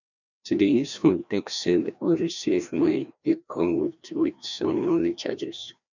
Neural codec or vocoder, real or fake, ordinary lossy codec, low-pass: codec, 16 kHz, 1 kbps, FreqCodec, larger model; fake; none; 7.2 kHz